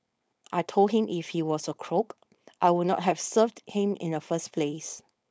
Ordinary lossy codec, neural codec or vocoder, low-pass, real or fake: none; codec, 16 kHz, 4.8 kbps, FACodec; none; fake